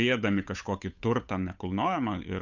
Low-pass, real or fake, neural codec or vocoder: 7.2 kHz; fake; codec, 16 kHz, 8 kbps, FunCodec, trained on Chinese and English, 25 frames a second